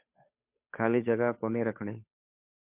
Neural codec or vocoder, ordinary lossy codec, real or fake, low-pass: codec, 16 kHz, 2 kbps, FunCodec, trained on Chinese and English, 25 frames a second; MP3, 32 kbps; fake; 3.6 kHz